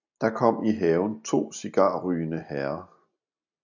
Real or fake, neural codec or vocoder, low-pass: real; none; 7.2 kHz